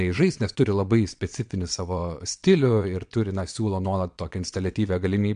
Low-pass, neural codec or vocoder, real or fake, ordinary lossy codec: 9.9 kHz; vocoder, 22.05 kHz, 80 mel bands, WaveNeXt; fake; MP3, 64 kbps